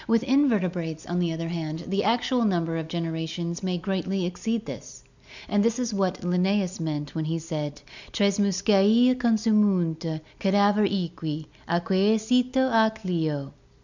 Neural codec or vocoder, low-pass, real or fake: none; 7.2 kHz; real